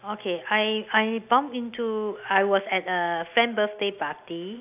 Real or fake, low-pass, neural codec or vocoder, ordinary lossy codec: real; 3.6 kHz; none; AAC, 32 kbps